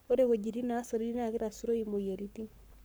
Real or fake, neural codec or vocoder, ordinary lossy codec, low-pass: fake; codec, 44.1 kHz, 7.8 kbps, Pupu-Codec; none; none